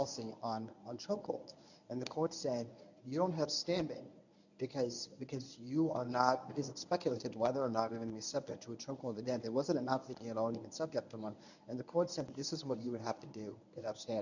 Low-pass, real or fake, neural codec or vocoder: 7.2 kHz; fake; codec, 24 kHz, 0.9 kbps, WavTokenizer, medium speech release version 1